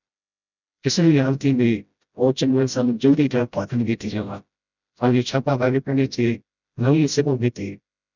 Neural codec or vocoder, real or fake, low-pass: codec, 16 kHz, 0.5 kbps, FreqCodec, smaller model; fake; 7.2 kHz